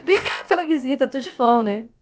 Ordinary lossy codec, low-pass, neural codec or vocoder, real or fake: none; none; codec, 16 kHz, about 1 kbps, DyCAST, with the encoder's durations; fake